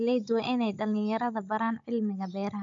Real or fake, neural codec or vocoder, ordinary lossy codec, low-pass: fake; codec, 16 kHz, 16 kbps, FunCodec, trained on Chinese and English, 50 frames a second; AAC, 64 kbps; 7.2 kHz